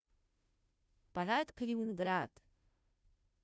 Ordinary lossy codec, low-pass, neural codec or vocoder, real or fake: none; none; codec, 16 kHz, 0.5 kbps, FunCodec, trained on Chinese and English, 25 frames a second; fake